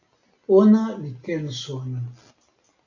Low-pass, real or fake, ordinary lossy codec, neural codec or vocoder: 7.2 kHz; real; AAC, 48 kbps; none